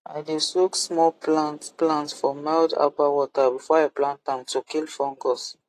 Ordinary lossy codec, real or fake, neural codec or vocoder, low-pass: AAC, 48 kbps; real; none; 14.4 kHz